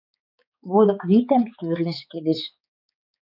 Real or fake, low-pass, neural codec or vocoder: fake; 5.4 kHz; codec, 16 kHz, 4 kbps, X-Codec, HuBERT features, trained on balanced general audio